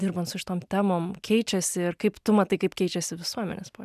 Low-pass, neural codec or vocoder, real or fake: 14.4 kHz; none; real